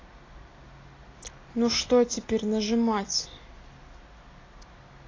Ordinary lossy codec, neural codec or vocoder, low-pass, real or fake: AAC, 32 kbps; none; 7.2 kHz; real